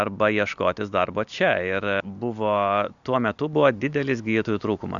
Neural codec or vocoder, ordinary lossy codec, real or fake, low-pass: none; Opus, 64 kbps; real; 7.2 kHz